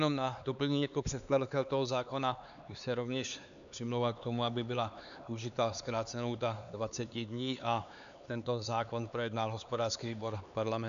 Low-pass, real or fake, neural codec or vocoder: 7.2 kHz; fake; codec, 16 kHz, 4 kbps, X-Codec, HuBERT features, trained on LibriSpeech